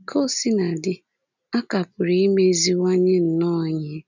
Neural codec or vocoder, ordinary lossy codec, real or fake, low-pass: none; none; real; 7.2 kHz